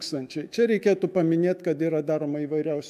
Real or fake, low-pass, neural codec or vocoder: fake; 14.4 kHz; autoencoder, 48 kHz, 128 numbers a frame, DAC-VAE, trained on Japanese speech